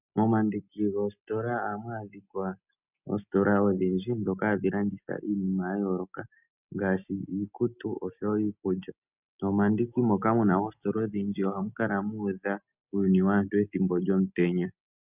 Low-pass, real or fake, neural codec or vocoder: 3.6 kHz; real; none